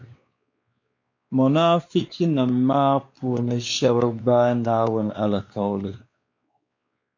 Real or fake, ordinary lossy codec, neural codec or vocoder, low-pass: fake; MP3, 48 kbps; codec, 16 kHz, 2 kbps, X-Codec, WavLM features, trained on Multilingual LibriSpeech; 7.2 kHz